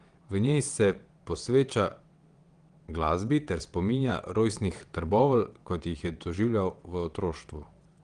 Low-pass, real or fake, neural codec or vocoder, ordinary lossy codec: 9.9 kHz; fake; vocoder, 22.05 kHz, 80 mel bands, WaveNeXt; Opus, 24 kbps